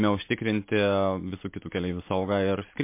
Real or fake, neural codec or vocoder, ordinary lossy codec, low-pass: real; none; MP3, 24 kbps; 3.6 kHz